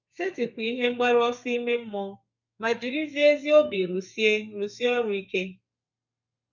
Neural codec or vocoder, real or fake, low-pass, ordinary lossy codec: codec, 32 kHz, 1.9 kbps, SNAC; fake; 7.2 kHz; none